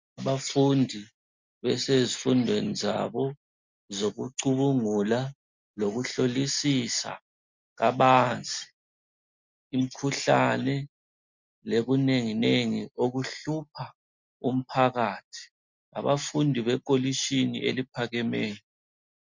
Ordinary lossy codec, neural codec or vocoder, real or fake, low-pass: MP3, 64 kbps; none; real; 7.2 kHz